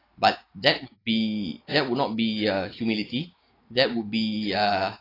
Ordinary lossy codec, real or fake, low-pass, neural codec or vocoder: AAC, 24 kbps; real; 5.4 kHz; none